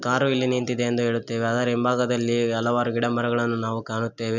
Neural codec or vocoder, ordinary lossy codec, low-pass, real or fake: none; none; 7.2 kHz; real